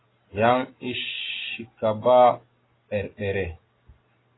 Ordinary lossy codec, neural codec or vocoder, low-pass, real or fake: AAC, 16 kbps; none; 7.2 kHz; real